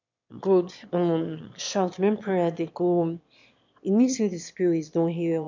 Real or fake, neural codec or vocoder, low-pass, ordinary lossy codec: fake; autoencoder, 22.05 kHz, a latent of 192 numbers a frame, VITS, trained on one speaker; 7.2 kHz; MP3, 64 kbps